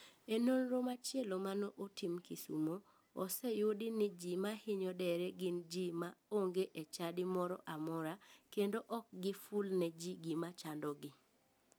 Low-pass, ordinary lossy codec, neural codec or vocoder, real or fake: none; none; none; real